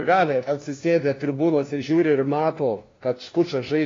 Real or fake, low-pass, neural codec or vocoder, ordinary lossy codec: fake; 7.2 kHz; codec, 16 kHz, 1 kbps, FunCodec, trained on LibriTTS, 50 frames a second; AAC, 32 kbps